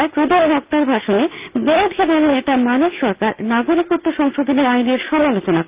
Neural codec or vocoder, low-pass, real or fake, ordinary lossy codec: none; 3.6 kHz; real; Opus, 24 kbps